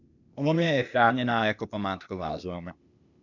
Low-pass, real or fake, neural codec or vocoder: 7.2 kHz; fake; codec, 16 kHz, 0.8 kbps, ZipCodec